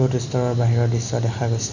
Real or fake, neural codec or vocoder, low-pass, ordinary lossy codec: real; none; 7.2 kHz; none